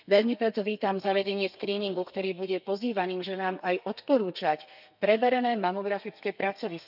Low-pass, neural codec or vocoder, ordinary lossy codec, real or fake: 5.4 kHz; codec, 32 kHz, 1.9 kbps, SNAC; none; fake